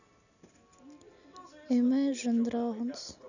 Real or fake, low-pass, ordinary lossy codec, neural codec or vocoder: real; 7.2 kHz; none; none